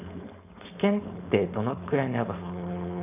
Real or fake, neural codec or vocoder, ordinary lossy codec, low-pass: fake; codec, 16 kHz, 4.8 kbps, FACodec; none; 3.6 kHz